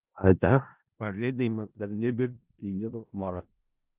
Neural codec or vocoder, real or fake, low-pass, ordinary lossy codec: codec, 16 kHz in and 24 kHz out, 0.4 kbps, LongCat-Audio-Codec, four codebook decoder; fake; 3.6 kHz; Opus, 32 kbps